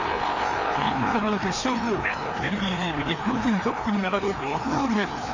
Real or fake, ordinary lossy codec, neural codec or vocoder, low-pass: fake; AAC, 48 kbps; codec, 16 kHz, 2 kbps, FreqCodec, larger model; 7.2 kHz